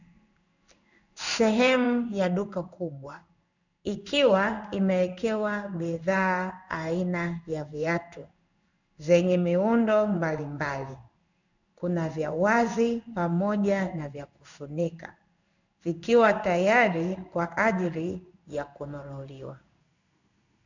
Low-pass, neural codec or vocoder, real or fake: 7.2 kHz; codec, 16 kHz in and 24 kHz out, 1 kbps, XY-Tokenizer; fake